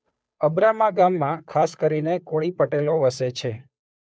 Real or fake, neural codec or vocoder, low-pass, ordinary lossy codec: fake; codec, 16 kHz, 2 kbps, FunCodec, trained on Chinese and English, 25 frames a second; none; none